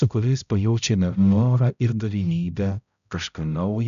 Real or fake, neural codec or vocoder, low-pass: fake; codec, 16 kHz, 0.5 kbps, X-Codec, HuBERT features, trained on balanced general audio; 7.2 kHz